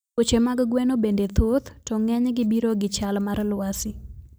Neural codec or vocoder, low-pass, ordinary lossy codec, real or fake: none; none; none; real